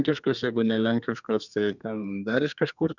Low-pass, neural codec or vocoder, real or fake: 7.2 kHz; codec, 32 kHz, 1.9 kbps, SNAC; fake